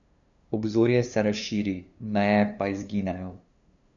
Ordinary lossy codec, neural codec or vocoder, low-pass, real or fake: none; codec, 16 kHz, 2 kbps, FunCodec, trained on LibriTTS, 25 frames a second; 7.2 kHz; fake